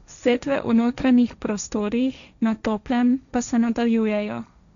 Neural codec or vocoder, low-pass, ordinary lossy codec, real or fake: codec, 16 kHz, 1.1 kbps, Voila-Tokenizer; 7.2 kHz; none; fake